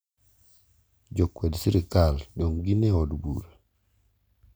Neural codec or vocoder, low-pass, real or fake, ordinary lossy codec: none; none; real; none